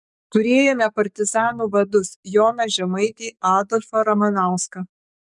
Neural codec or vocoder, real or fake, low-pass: codec, 44.1 kHz, 7.8 kbps, DAC; fake; 10.8 kHz